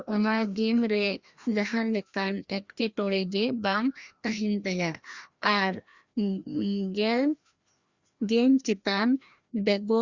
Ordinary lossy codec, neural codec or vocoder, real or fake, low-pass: Opus, 64 kbps; codec, 16 kHz, 1 kbps, FreqCodec, larger model; fake; 7.2 kHz